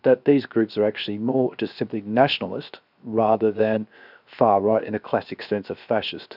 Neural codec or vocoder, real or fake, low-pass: codec, 16 kHz, 0.7 kbps, FocalCodec; fake; 5.4 kHz